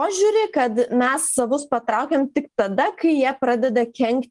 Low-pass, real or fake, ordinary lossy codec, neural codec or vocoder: 10.8 kHz; real; Opus, 24 kbps; none